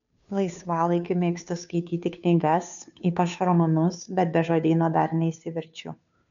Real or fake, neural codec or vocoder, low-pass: fake; codec, 16 kHz, 2 kbps, FunCodec, trained on Chinese and English, 25 frames a second; 7.2 kHz